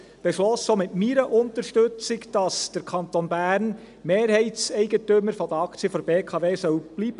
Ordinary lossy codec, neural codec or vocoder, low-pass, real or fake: none; none; 10.8 kHz; real